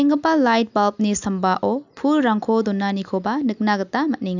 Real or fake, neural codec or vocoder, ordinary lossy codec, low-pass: real; none; none; 7.2 kHz